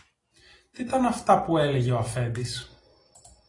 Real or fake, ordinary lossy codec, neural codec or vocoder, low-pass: real; AAC, 32 kbps; none; 10.8 kHz